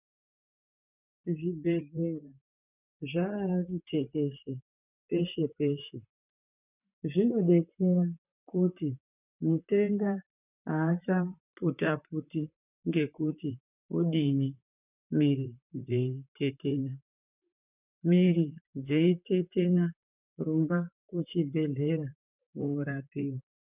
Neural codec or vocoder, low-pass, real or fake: vocoder, 44.1 kHz, 128 mel bands, Pupu-Vocoder; 3.6 kHz; fake